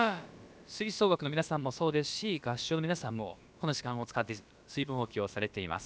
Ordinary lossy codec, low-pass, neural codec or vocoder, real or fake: none; none; codec, 16 kHz, about 1 kbps, DyCAST, with the encoder's durations; fake